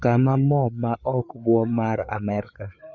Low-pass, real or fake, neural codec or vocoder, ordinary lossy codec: 7.2 kHz; fake; codec, 16 kHz, 4 kbps, FreqCodec, larger model; none